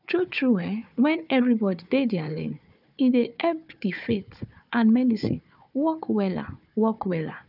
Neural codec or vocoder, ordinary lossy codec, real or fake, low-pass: codec, 16 kHz, 4 kbps, FunCodec, trained on Chinese and English, 50 frames a second; none; fake; 5.4 kHz